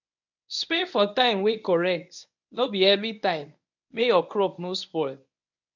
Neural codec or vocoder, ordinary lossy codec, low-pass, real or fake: codec, 24 kHz, 0.9 kbps, WavTokenizer, medium speech release version 1; none; 7.2 kHz; fake